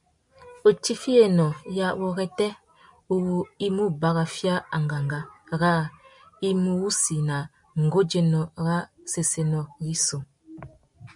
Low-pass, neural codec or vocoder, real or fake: 10.8 kHz; none; real